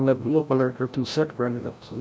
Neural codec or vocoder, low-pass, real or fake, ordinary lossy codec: codec, 16 kHz, 0.5 kbps, FreqCodec, larger model; none; fake; none